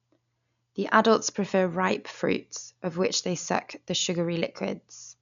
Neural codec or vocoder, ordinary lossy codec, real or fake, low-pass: none; none; real; 7.2 kHz